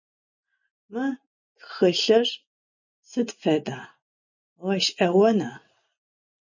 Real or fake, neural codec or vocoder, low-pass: real; none; 7.2 kHz